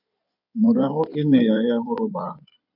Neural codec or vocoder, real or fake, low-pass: codec, 16 kHz in and 24 kHz out, 2.2 kbps, FireRedTTS-2 codec; fake; 5.4 kHz